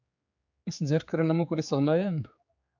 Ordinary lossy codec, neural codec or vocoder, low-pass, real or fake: AAC, 48 kbps; codec, 16 kHz, 4 kbps, X-Codec, HuBERT features, trained on general audio; 7.2 kHz; fake